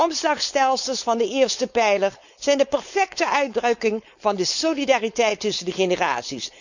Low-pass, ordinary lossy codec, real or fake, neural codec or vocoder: 7.2 kHz; none; fake; codec, 16 kHz, 4.8 kbps, FACodec